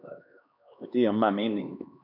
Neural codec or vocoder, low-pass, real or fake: codec, 16 kHz, 2 kbps, X-Codec, HuBERT features, trained on LibriSpeech; 5.4 kHz; fake